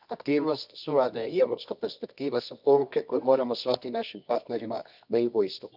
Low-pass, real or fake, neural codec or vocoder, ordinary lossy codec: 5.4 kHz; fake; codec, 24 kHz, 0.9 kbps, WavTokenizer, medium music audio release; none